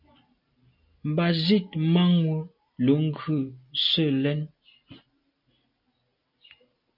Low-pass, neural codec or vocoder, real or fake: 5.4 kHz; none; real